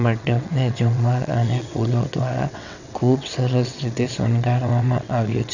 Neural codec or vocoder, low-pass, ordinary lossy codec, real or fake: vocoder, 22.05 kHz, 80 mel bands, WaveNeXt; 7.2 kHz; none; fake